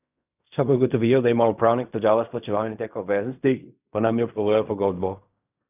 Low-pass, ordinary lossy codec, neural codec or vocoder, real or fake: 3.6 kHz; none; codec, 16 kHz in and 24 kHz out, 0.4 kbps, LongCat-Audio-Codec, fine tuned four codebook decoder; fake